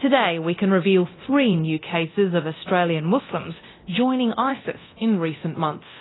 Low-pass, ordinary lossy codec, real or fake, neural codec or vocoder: 7.2 kHz; AAC, 16 kbps; fake; codec, 24 kHz, 0.9 kbps, DualCodec